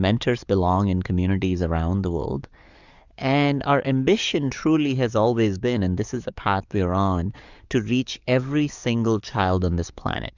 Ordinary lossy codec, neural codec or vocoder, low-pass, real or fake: Opus, 64 kbps; codec, 44.1 kHz, 7.8 kbps, DAC; 7.2 kHz; fake